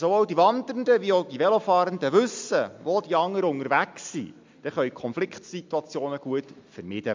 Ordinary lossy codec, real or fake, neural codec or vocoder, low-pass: MP3, 64 kbps; real; none; 7.2 kHz